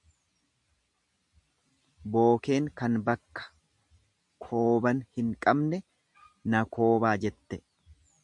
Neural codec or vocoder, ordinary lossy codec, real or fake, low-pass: none; MP3, 96 kbps; real; 10.8 kHz